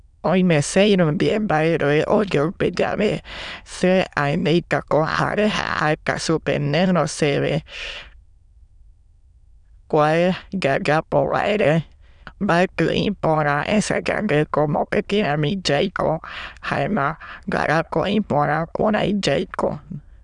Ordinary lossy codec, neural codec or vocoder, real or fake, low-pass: none; autoencoder, 22.05 kHz, a latent of 192 numbers a frame, VITS, trained on many speakers; fake; 9.9 kHz